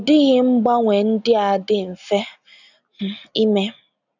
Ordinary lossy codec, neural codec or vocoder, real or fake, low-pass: none; none; real; 7.2 kHz